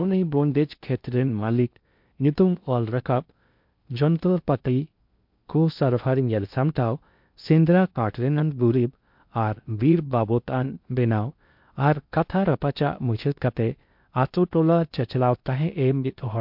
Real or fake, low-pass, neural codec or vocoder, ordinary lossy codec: fake; 5.4 kHz; codec, 16 kHz in and 24 kHz out, 0.6 kbps, FocalCodec, streaming, 2048 codes; MP3, 48 kbps